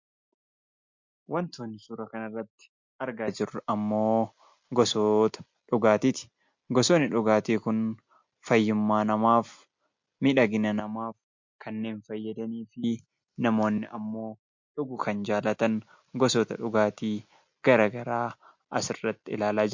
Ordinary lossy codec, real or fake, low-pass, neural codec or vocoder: MP3, 48 kbps; real; 7.2 kHz; none